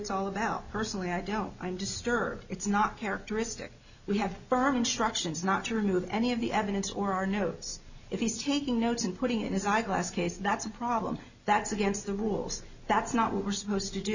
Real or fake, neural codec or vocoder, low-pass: real; none; 7.2 kHz